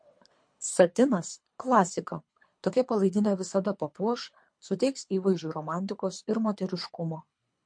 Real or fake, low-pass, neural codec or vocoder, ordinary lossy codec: fake; 9.9 kHz; codec, 24 kHz, 3 kbps, HILCodec; MP3, 48 kbps